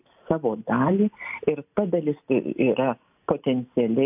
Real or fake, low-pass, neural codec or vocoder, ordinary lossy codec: real; 3.6 kHz; none; AAC, 32 kbps